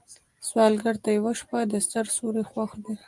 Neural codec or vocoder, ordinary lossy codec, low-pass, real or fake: none; Opus, 32 kbps; 10.8 kHz; real